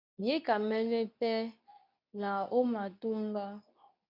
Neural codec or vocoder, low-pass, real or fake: codec, 24 kHz, 0.9 kbps, WavTokenizer, medium speech release version 2; 5.4 kHz; fake